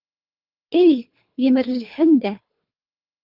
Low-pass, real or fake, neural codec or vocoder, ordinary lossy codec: 5.4 kHz; fake; codec, 24 kHz, 3 kbps, HILCodec; Opus, 32 kbps